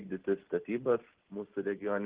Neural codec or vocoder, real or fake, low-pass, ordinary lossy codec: none; real; 3.6 kHz; Opus, 16 kbps